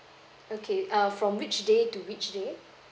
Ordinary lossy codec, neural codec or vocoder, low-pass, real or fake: none; none; none; real